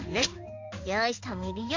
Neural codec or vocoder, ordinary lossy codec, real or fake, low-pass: codec, 16 kHz in and 24 kHz out, 1 kbps, XY-Tokenizer; AAC, 48 kbps; fake; 7.2 kHz